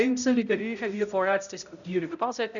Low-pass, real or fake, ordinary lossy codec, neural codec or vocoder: 7.2 kHz; fake; none; codec, 16 kHz, 0.5 kbps, X-Codec, HuBERT features, trained on balanced general audio